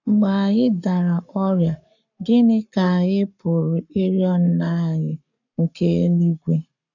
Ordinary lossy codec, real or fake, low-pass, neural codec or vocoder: none; fake; 7.2 kHz; codec, 44.1 kHz, 7.8 kbps, Pupu-Codec